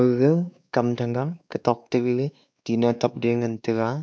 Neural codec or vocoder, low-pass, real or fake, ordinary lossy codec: codec, 16 kHz, 2 kbps, X-Codec, WavLM features, trained on Multilingual LibriSpeech; none; fake; none